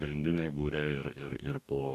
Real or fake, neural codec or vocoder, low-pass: fake; codec, 44.1 kHz, 2.6 kbps, DAC; 14.4 kHz